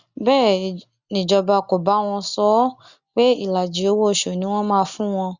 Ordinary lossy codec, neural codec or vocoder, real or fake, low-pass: Opus, 64 kbps; none; real; 7.2 kHz